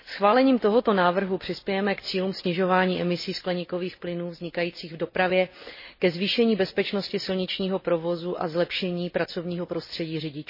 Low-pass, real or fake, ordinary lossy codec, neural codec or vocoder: 5.4 kHz; real; MP3, 24 kbps; none